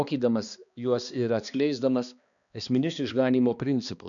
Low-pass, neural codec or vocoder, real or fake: 7.2 kHz; codec, 16 kHz, 2 kbps, X-Codec, HuBERT features, trained on balanced general audio; fake